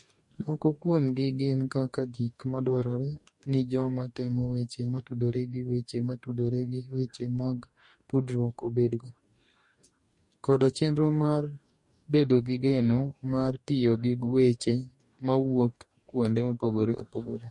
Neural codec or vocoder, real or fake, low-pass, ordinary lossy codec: codec, 44.1 kHz, 2.6 kbps, DAC; fake; 10.8 kHz; MP3, 48 kbps